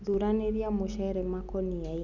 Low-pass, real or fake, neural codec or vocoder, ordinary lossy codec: 7.2 kHz; real; none; none